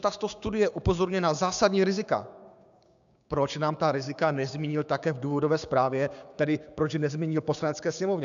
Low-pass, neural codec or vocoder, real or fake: 7.2 kHz; codec, 16 kHz, 6 kbps, DAC; fake